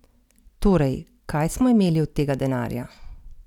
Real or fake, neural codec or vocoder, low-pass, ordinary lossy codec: real; none; 19.8 kHz; none